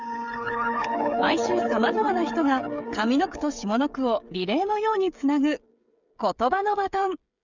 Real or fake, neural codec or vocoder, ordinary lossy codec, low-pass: fake; codec, 16 kHz, 8 kbps, FreqCodec, smaller model; none; 7.2 kHz